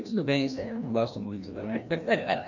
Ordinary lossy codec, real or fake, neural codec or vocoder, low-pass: none; fake; codec, 16 kHz, 1 kbps, FreqCodec, larger model; 7.2 kHz